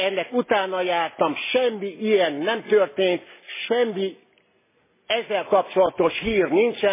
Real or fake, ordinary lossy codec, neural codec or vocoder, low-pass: real; MP3, 16 kbps; none; 3.6 kHz